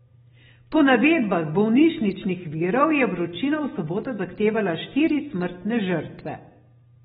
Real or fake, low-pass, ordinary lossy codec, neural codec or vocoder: real; 7.2 kHz; AAC, 16 kbps; none